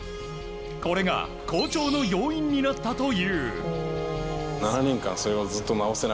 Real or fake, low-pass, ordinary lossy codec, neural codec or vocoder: real; none; none; none